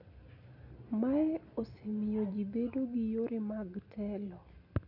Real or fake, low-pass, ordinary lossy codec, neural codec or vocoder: real; 5.4 kHz; none; none